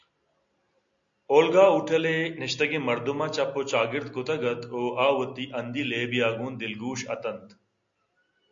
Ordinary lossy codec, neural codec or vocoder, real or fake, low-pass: MP3, 48 kbps; none; real; 7.2 kHz